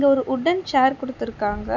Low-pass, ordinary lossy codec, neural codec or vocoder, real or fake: 7.2 kHz; none; none; real